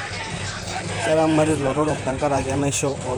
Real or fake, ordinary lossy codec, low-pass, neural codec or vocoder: fake; none; none; vocoder, 44.1 kHz, 128 mel bands, Pupu-Vocoder